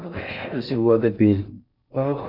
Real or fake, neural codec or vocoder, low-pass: fake; codec, 16 kHz in and 24 kHz out, 0.6 kbps, FocalCodec, streaming, 4096 codes; 5.4 kHz